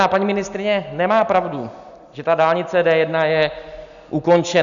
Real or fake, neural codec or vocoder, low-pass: real; none; 7.2 kHz